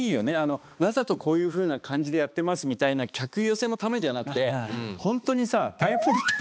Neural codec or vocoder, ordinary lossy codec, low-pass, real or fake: codec, 16 kHz, 2 kbps, X-Codec, HuBERT features, trained on balanced general audio; none; none; fake